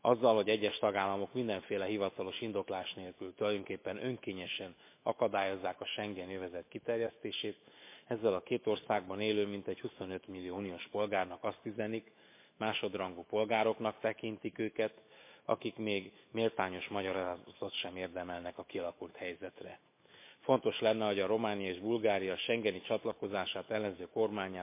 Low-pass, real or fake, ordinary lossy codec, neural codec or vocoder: 3.6 kHz; fake; MP3, 24 kbps; autoencoder, 48 kHz, 128 numbers a frame, DAC-VAE, trained on Japanese speech